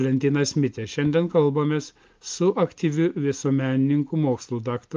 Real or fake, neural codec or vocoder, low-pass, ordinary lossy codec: real; none; 7.2 kHz; Opus, 24 kbps